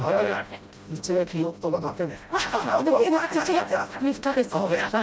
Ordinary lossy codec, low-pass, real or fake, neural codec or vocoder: none; none; fake; codec, 16 kHz, 0.5 kbps, FreqCodec, smaller model